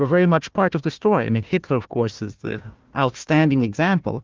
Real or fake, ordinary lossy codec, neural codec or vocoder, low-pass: fake; Opus, 32 kbps; codec, 16 kHz, 1 kbps, FunCodec, trained on Chinese and English, 50 frames a second; 7.2 kHz